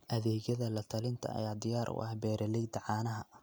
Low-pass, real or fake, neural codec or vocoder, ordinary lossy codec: none; real; none; none